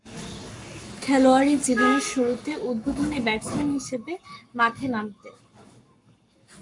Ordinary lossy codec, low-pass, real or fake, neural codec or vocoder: MP3, 64 kbps; 10.8 kHz; fake; codec, 44.1 kHz, 7.8 kbps, Pupu-Codec